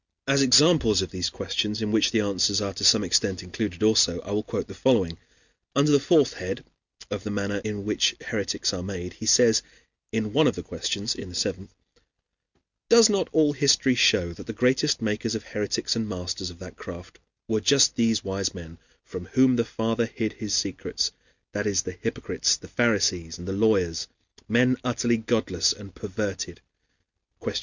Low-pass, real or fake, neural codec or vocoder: 7.2 kHz; real; none